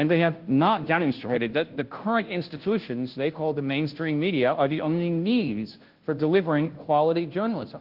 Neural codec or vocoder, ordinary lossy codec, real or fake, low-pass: codec, 16 kHz, 0.5 kbps, FunCodec, trained on Chinese and English, 25 frames a second; Opus, 16 kbps; fake; 5.4 kHz